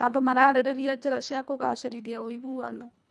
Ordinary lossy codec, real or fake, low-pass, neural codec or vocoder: none; fake; none; codec, 24 kHz, 1.5 kbps, HILCodec